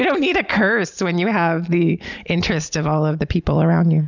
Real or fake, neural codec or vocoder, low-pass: real; none; 7.2 kHz